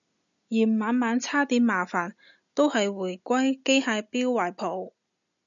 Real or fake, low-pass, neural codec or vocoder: real; 7.2 kHz; none